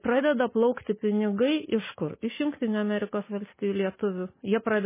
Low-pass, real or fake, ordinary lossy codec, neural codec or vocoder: 3.6 kHz; real; MP3, 16 kbps; none